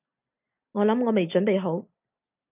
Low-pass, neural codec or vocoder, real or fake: 3.6 kHz; none; real